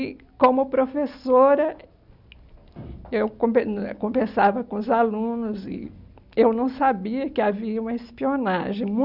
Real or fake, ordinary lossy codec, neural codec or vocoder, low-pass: real; none; none; 5.4 kHz